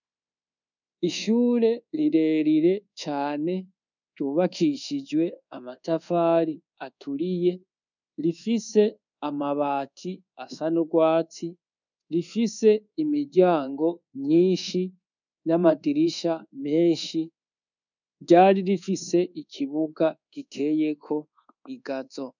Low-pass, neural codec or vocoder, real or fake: 7.2 kHz; codec, 24 kHz, 1.2 kbps, DualCodec; fake